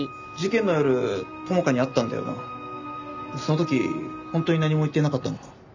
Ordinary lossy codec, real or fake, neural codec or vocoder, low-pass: none; real; none; 7.2 kHz